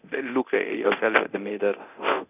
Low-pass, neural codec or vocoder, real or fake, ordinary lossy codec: 3.6 kHz; codec, 24 kHz, 0.9 kbps, DualCodec; fake; none